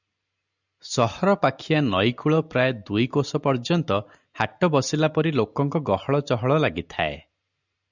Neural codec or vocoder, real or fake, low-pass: none; real; 7.2 kHz